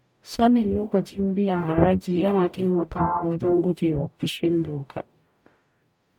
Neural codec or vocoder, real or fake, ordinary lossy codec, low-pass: codec, 44.1 kHz, 0.9 kbps, DAC; fake; MP3, 96 kbps; 19.8 kHz